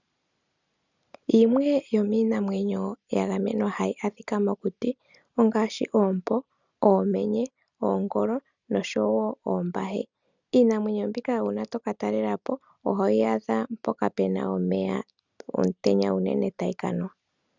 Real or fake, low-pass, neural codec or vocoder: real; 7.2 kHz; none